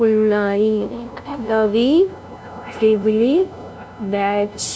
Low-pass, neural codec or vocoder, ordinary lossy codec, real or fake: none; codec, 16 kHz, 0.5 kbps, FunCodec, trained on LibriTTS, 25 frames a second; none; fake